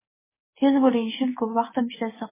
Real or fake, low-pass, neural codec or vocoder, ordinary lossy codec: real; 3.6 kHz; none; MP3, 16 kbps